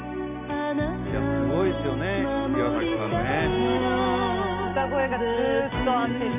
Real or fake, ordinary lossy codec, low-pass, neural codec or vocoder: real; none; 3.6 kHz; none